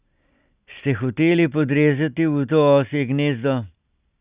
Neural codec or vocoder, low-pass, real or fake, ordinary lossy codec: none; 3.6 kHz; real; Opus, 64 kbps